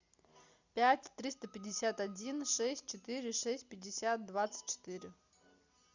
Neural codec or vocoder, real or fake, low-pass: none; real; 7.2 kHz